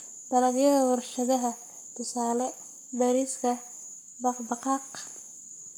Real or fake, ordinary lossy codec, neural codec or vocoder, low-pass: fake; none; codec, 44.1 kHz, 7.8 kbps, Pupu-Codec; none